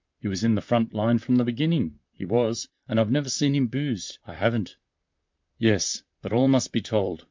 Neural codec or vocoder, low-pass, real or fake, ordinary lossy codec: vocoder, 44.1 kHz, 128 mel bands, Pupu-Vocoder; 7.2 kHz; fake; MP3, 64 kbps